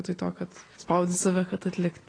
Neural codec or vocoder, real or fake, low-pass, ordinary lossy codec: none; real; 9.9 kHz; AAC, 32 kbps